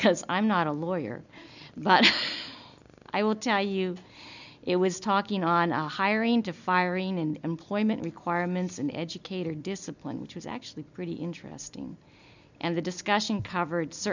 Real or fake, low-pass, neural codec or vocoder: real; 7.2 kHz; none